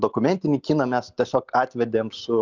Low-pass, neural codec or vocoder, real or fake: 7.2 kHz; none; real